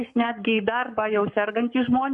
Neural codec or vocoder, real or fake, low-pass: codec, 44.1 kHz, 7.8 kbps, Pupu-Codec; fake; 10.8 kHz